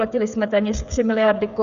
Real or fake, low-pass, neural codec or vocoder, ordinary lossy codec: fake; 7.2 kHz; codec, 16 kHz, 16 kbps, FreqCodec, smaller model; Opus, 64 kbps